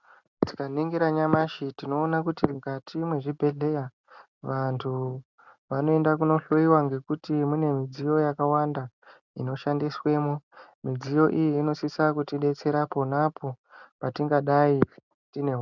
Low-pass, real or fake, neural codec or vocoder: 7.2 kHz; real; none